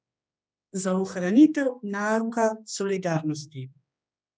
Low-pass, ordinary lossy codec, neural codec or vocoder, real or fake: none; none; codec, 16 kHz, 2 kbps, X-Codec, HuBERT features, trained on general audio; fake